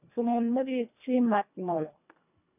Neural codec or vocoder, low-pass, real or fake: codec, 24 kHz, 1.5 kbps, HILCodec; 3.6 kHz; fake